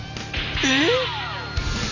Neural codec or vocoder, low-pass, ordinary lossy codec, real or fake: none; 7.2 kHz; none; real